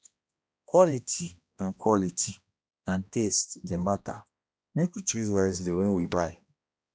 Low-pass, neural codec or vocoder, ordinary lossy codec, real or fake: none; codec, 16 kHz, 1 kbps, X-Codec, HuBERT features, trained on balanced general audio; none; fake